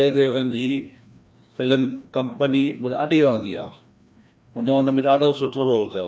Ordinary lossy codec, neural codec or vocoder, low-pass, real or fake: none; codec, 16 kHz, 1 kbps, FreqCodec, larger model; none; fake